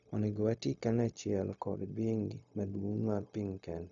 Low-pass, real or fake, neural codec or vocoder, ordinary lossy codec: 7.2 kHz; fake; codec, 16 kHz, 0.4 kbps, LongCat-Audio-Codec; none